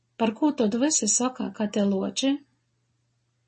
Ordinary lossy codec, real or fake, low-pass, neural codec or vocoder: MP3, 32 kbps; real; 10.8 kHz; none